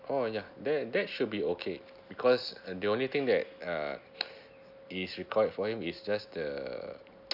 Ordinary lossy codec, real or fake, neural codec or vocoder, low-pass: none; real; none; 5.4 kHz